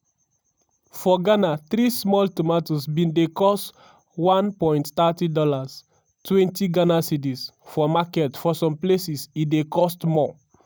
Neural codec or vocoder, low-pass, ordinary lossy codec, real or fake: none; none; none; real